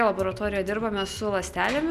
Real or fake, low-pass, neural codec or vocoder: real; 14.4 kHz; none